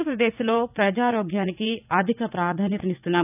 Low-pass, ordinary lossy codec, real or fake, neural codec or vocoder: 3.6 kHz; none; fake; vocoder, 44.1 kHz, 80 mel bands, Vocos